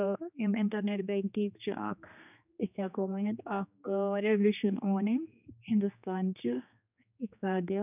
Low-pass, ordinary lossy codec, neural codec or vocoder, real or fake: 3.6 kHz; none; codec, 16 kHz, 2 kbps, X-Codec, HuBERT features, trained on general audio; fake